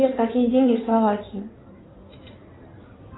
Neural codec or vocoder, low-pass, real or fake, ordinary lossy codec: codec, 16 kHz in and 24 kHz out, 2.2 kbps, FireRedTTS-2 codec; 7.2 kHz; fake; AAC, 16 kbps